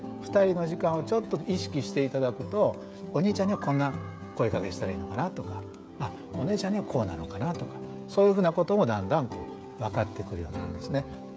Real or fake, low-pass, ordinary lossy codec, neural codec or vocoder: fake; none; none; codec, 16 kHz, 16 kbps, FreqCodec, smaller model